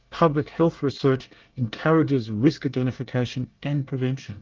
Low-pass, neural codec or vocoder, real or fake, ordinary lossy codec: 7.2 kHz; codec, 24 kHz, 1 kbps, SNAC; fake; Opus, 32 kbps